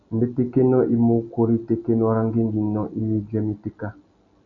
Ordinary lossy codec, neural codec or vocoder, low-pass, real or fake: MP3, 96 kbps; none; 7.2 kHz; real